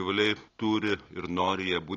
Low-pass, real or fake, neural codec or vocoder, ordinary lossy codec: 7.2 kHz; real; none; AAC, 32 kbps